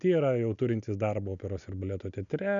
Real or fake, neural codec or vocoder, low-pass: real; none; 7.2 kHz